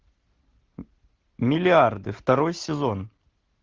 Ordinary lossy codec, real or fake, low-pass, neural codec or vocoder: Opus, 16 kbps; real; 7.2 kHz; none